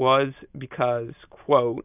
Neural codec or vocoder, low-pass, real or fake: none; 3.6 kHz; real